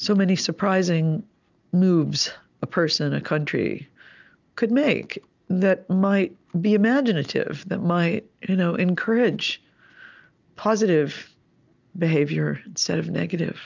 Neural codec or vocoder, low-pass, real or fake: none; 7.2 kHz; real